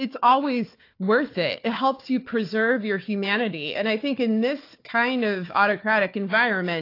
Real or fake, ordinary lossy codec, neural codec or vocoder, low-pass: fake; AAC, 32 kbps; codec, 16 kHz, 4 kbps, FunCodec, trained on Chinese and English, 50 frames a second; 5.4 kHz